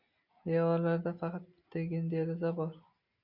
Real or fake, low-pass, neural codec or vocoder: real; 5.4 kHz; none